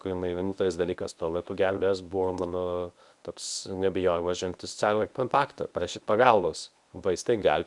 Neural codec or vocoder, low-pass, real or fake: codec, 24 kHz, 0.9 kbps, WavTokenizer, medium speech release version 1; 10.8 kHz; fake